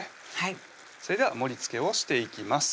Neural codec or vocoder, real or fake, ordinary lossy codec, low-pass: none; real; none; none